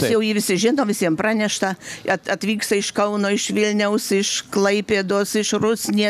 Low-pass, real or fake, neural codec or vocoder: 14.4 kHz; real; none